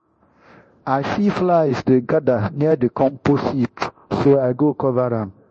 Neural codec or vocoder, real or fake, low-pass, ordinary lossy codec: codec, 24 kHz, 0.9 kbps, DualCodec; fake; 10.8 kHz; MP3, 32 kbps